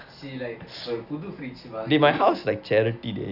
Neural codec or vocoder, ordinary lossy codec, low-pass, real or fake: none; none; 5.4 kHz; real